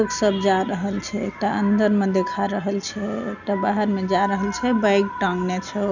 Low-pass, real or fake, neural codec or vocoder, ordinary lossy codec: 7.2 kHz; real; none; none